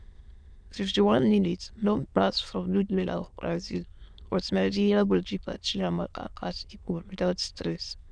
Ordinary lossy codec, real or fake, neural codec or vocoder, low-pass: none; fake; autoencoder, 22.05 kHz, a latent of 192 numbers a frame, VITS, trained on many speakers; 9.9 kHz